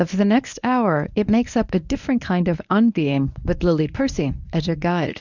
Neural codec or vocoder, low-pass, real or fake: codec, 24 kHz, 0.9 kbps, WavTokenizer, medium speech release version 1; 7.2 kHz; fake